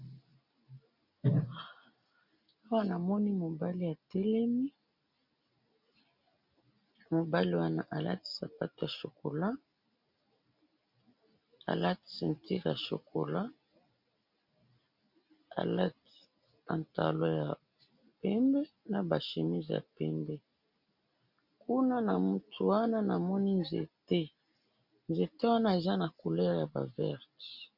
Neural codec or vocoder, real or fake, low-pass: none; real; 5.4 kHz